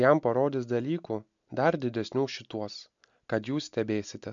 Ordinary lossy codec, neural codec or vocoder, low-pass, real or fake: MP3, 64 kbps; none; 7.2 kHz; real